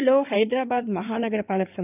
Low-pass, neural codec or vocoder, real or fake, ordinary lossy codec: 3.6 kHz; codec, 16 kHz in and 24 kHz out, 2.2 kbps, FireRedTTS-2 codec; fake; none